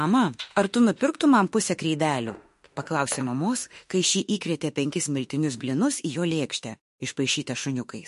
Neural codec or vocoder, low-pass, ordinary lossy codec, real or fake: autoencoder, 48 kHz, 32 numbers a frame, DAC-VAE, trained on Japanese speech; 14.4 kHz; MP3, 48 kbps; fake